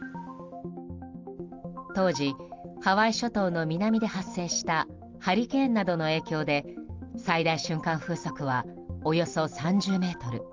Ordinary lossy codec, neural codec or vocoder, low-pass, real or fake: Opus, 32 kbps; none; 7.2 kHz; real